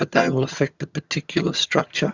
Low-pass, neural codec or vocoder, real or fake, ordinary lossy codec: 7.2 kHz; vocoder, 22.05 kHz, 80 mel bands, HiFi-GAN; fake; Opus, 64 kbps